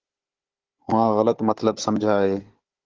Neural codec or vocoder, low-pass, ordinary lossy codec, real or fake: codec, 16 kHz, 16 kbps, FunCodec, trained on Chinese and English, 50 frames a second; 7.2 kHz; Opus, 16 kbps; fake